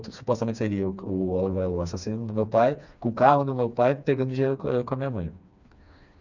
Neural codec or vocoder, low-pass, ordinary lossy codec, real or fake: codec, 16 kHz, 2 kbps, FreqCodec, smaller model; 7.2 kHz; none; fake